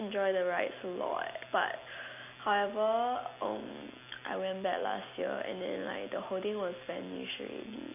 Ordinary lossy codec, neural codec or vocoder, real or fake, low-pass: none; none; real; 3.6 kHz